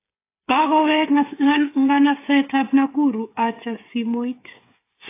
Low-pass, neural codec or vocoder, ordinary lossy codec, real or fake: 3.6 kHz; codec, 16 kHz, 8 kbps, FreqCodec, smaller model; AAC, 24 kbps; fake